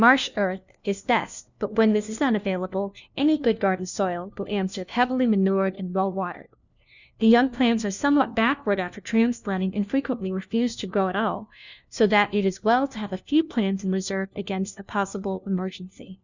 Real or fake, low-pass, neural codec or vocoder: fake; 7.2 kHz; codec, 16 kHz, 1 kbps, FunCodec, trained on LibriTTS, 50 frames a second